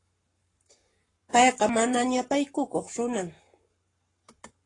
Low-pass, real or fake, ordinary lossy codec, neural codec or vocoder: 10.8 kHz; fake; AAC, 32 kbps; codec, 44.1 kHz, 7.8 kbps, Pupu-Codec